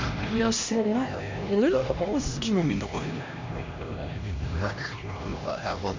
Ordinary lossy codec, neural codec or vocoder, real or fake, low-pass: MP3, 64 kbps; codec, 16 kHz, 1 kbps, X-Codec, HuBERT features, trained on LibriSpeech; fake; 7.2 kHz